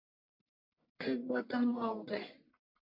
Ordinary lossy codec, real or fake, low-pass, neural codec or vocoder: MP3, 32 kbps; fake; 5.4 kHz; codec, 44.1 kHz, 1.7 kbps, Pupu-Codec